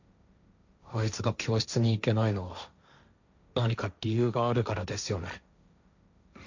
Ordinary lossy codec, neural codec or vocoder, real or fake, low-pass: none; codec, 16 kHz, 1.1 kbps, Voila-Tokenizer; fake; 7.2 kHz